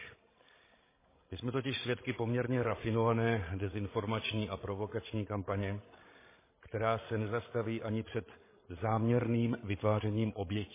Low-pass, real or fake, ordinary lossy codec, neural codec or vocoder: 3.6 kHz; fake; MP3, 16 kbps; codec, 16 kHz, 16 kbps, FreqCodec, larger model